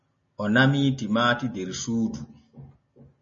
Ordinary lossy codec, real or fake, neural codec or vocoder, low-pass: MP3, 32 kbps; real; none; 7.2 kHz